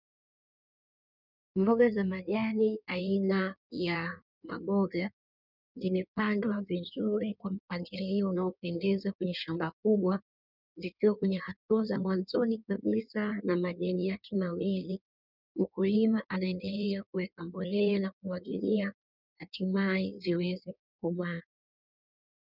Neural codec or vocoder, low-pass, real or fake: codec, 16 kHz in and 24 kHz out, 1.1 kbps, FireRedTTS-2 codec; 5.4 kHz; fake